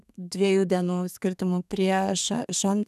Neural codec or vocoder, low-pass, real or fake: codec, 32 kHz, 1.9 kbps, SNAC; 14.4 kHz; fake